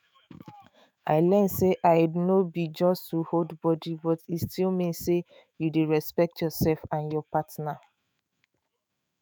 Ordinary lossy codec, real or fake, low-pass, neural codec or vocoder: none; fake; none; autoencoder, 48 kHz, 128 numbers a frame, DAC-VAE, trained on Japanese speech